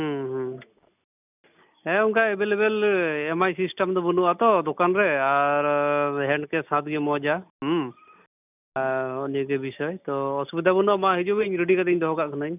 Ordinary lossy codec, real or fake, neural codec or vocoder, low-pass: none; real; none; 3.6 kHz